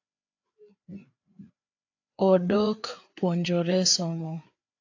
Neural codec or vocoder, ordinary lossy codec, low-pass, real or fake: codec, 16 kHz, 4 kbps, FreqCodec, larger model; AAC, 48 kbps; 7.2 kHz; fake